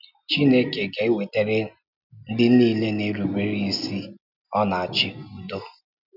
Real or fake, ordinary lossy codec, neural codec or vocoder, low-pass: real; none; none; 5.4 kHz